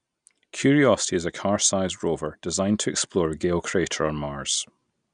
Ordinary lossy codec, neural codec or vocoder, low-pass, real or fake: none; none; 9.9 kHz; real